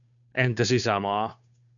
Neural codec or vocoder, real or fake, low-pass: codec, 16 kHz, 2 kbps, FunCodec, trained on Chinese and English, 25 frames a second; fake; 7.2 kHz